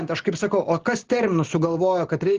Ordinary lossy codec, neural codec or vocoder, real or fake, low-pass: Opus, 16 kbps; none; real; 7.2 kHz